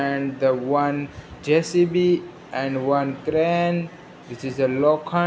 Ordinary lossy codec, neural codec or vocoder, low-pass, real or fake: none; none; none; real